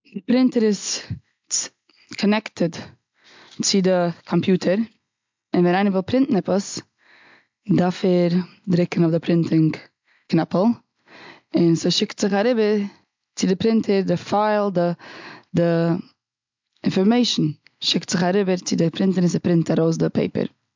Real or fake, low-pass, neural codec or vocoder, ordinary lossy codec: real; 7.2 kHz; none; MP3, 64 kbps